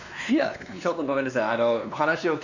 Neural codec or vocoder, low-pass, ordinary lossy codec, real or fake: codec, 16 kHz, 2 kbps, X-Codec, WavLM features, trained on Multilingual LibriSpeech; 7.2 kHz; none; fake